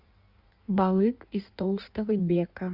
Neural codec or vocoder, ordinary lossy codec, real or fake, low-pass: codec, 16 kHz in and 24 kHz out, 1.1 kbps, FireRedTTS-2 codec; Opus, 64 kbps; fake; 5.4 kHz